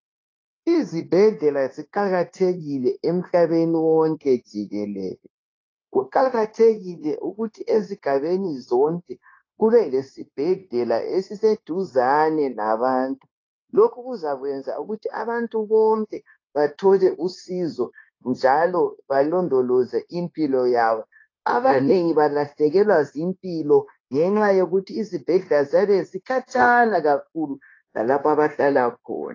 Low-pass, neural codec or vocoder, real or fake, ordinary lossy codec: 7.2 kHz; codec, 16 kHz, 0.9 kbps, LongCat-Audio-Codec; fake; AAC, 32 kbps